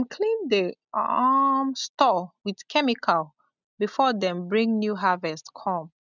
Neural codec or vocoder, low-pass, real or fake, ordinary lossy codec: none; 7.2 kHz; real; none